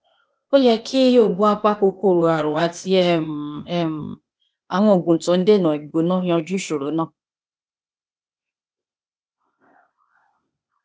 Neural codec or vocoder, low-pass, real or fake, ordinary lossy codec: codec, 16 kHz, 0.8 kbps, ZipCodec; none; fake; none